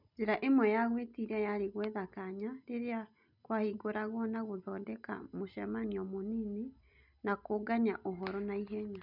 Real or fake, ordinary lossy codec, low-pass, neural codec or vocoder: real; none; 5.4 kHz; none